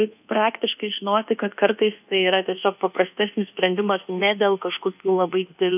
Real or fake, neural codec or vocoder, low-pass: fake; codec, 24 kHz, 1.2 kbps, DualCodec; 3.6 kHz